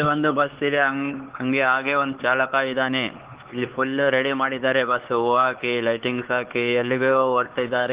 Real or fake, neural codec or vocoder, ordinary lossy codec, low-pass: fake; codec, 16 kHz, 4 kbps, X-Codec, HuBERT features, trained on LibriSpeech; Opus, 16 kbps; 3.6 kHz